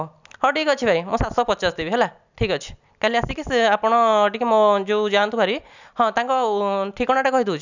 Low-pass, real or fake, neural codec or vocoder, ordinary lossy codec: 7.2 kHz; real; none; none